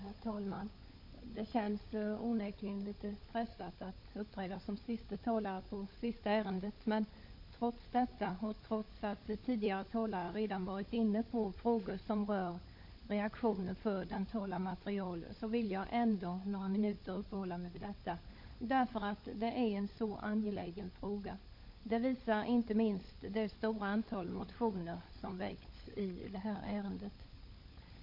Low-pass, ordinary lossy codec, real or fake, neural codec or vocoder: 5.4 kHz; MP3, 32 kbps; fake; codec, 16 kHz, 16 kbps, FunCodec, trained on LibriTTS, 50 frames a second